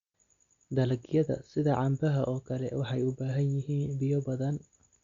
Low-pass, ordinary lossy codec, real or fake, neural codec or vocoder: 7.2 kHz; Opus, 64 kbps; real; none